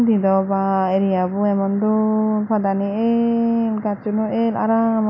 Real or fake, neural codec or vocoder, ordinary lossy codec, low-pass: real; none; MP3, 64 kbps; 7.2 kHz